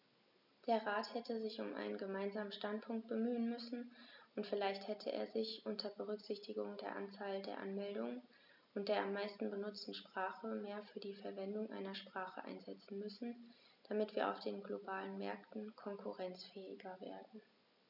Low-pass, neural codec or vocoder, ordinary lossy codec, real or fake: 5.4 kHz; none; none; real